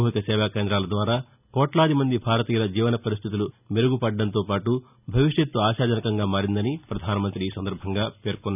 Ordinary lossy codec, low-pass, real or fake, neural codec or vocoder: none; 3.6 kHz; real; none